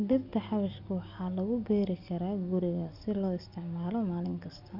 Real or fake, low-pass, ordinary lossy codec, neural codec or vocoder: real; 5.4 kHz; Opus, 64 kbps; none